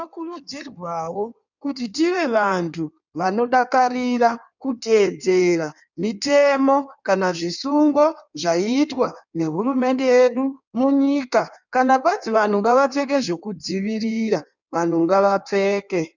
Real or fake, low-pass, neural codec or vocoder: fake; 7.2 kHz; codec, 16 kHz in and 24 kHz out, 1.1 kbps, FireRedTTS-2 codec